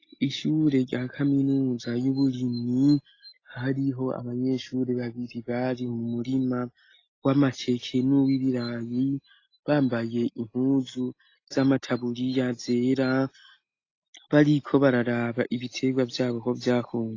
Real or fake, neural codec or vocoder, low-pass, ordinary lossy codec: real; none; 7.2 kHz; AAC, 32 kbps